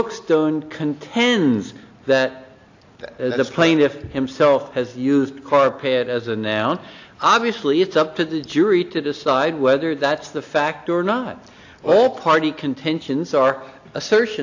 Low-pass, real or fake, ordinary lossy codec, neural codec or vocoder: 7.2 kHz; real; AAC, 48 kbps; none